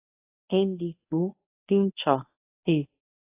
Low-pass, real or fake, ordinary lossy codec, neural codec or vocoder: 3.6 kHz; fake; AAC, 24 kbps; codec, 24 kHz, 0.9 kbps, WavTokenizer, medium speech release version 1